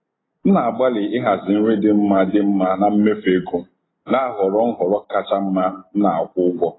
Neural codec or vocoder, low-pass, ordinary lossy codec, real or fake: none; 7.2 kHz; AAC, 16 kbps; real